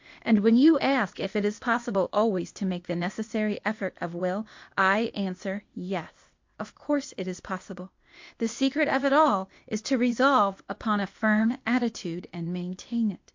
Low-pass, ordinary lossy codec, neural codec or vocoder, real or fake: 7.2 kHz; MP3, 48 kbps; codec, 16 kHz, 0.8 kbps, ZipCodec; fake